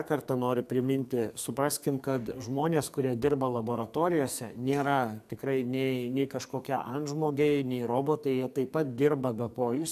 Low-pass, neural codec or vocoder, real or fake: 14.4 kHz; codec, 44.1 kHz, 2.6 kbps, SNAC; fake